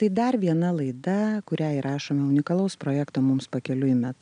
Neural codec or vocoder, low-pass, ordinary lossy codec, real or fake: none; 9.9 kHz; MP3, 96 kbps; real